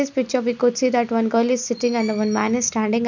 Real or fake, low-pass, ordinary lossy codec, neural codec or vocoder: real; 7.2 kHz; none; none